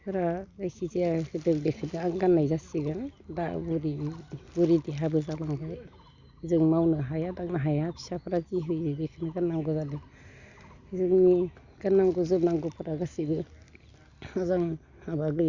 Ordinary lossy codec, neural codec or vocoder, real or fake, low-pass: Opus, 64 kbps; none; real; 7.2 kHz